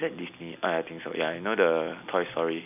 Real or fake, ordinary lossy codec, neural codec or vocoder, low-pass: real; none; none; 3.6 kHz